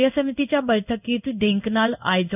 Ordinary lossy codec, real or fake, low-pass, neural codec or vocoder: none; fake; 3.6 kHz; codec, 16 kHz in and 24 kHz out, 1 kbps, XY-Tokenizer